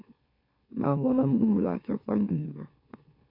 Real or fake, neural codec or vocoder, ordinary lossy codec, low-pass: fake; autoencoder, 44.1 kHz, a latent of 192 numbers a frame, MeloTTS; MP3, 32 kbps; 5.4 kHz